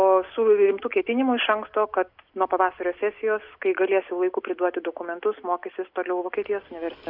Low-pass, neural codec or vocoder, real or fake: 5.4 kHz; none; real